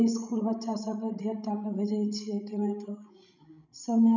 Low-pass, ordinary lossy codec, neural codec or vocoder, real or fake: 7.2 kHz; none; codec, 16 kHz, 16 kbps, FreqCodec, larger model; fake